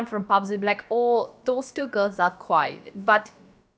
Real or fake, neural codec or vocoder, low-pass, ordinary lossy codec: fake; codec, 16 kHz, about 1 kbps, DyCAST, with the encoder's durations; none; none